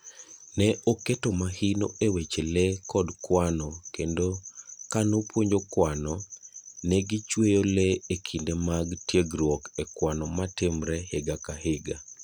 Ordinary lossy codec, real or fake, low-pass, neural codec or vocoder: none; real; none; none